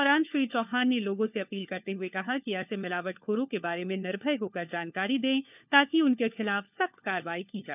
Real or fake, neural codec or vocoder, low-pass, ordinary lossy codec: fake; codec, 16 kHz, 4 kbps, FunCodec, trained on LibriTTS, 50 frames a second; 3.6 kHz; none